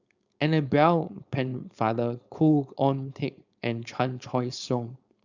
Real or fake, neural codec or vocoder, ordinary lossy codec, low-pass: fake; codec, 16 kHz, 4.8 kbps, FACodec; Opus, 64 kbps; 7.2 kHz